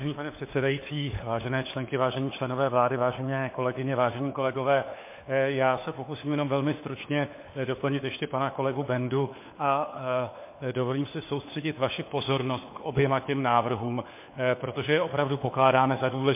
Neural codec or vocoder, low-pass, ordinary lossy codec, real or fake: codec, 16 kHz, 4 kbps, FunCodec, trained on LibriTTS, 50 frames a second; 3.6 kHz; MP3, 24 kbps; fake